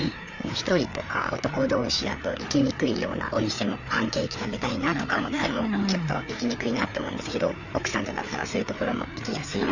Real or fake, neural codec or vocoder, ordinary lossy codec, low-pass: fake; codec, 16 kHz, 4 kbps, FreqCodec, larger model; none; 7.2 kHz